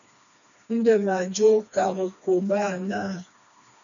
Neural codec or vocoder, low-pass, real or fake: codec, 16 kHz, 2 kbps, FreqCodec, smaller model; 7.2 kHz; fake